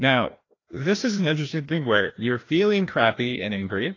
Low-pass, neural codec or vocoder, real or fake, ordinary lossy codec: 7.2 kHz; codec, 16 kHz, 1 kbps, FreqCodec, larger model; fake; AAC, 32 kbps